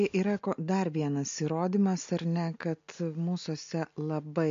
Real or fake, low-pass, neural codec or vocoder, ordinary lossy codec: real; 7.2 kHz; none; MP3, 48 kbps